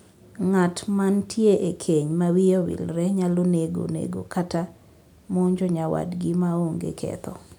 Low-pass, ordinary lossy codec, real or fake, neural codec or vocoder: 19.8 kHz; none; real; none